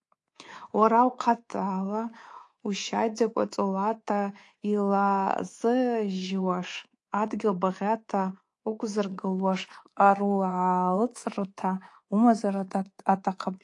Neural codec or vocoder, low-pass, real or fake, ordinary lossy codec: codec, 24 kHz, 3.1 kbps, DualCodec; 10.8 kHz; fake; AAC, 48 kbps